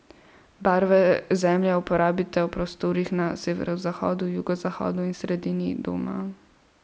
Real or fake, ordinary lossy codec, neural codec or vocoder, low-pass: real; none; none; none